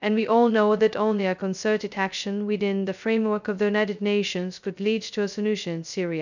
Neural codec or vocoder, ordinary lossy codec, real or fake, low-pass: codec, 16 kHz, 0.2 kbps, FocalCodec; none; fake; 7.2 kHz